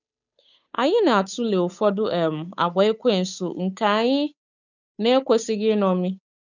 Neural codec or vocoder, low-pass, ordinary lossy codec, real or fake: codec, 16 kHz, 8 kbps, FunCodec, trained on Chinese and English, 25 frames a second; 7.2 kHz; none; fake